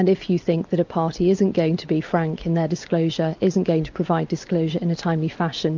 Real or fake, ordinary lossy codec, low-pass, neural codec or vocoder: real; MP3, 64 kbps; 7.2 kHz; none